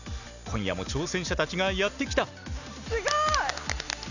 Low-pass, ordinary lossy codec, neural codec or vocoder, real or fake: 7.2 kHz; none; none; real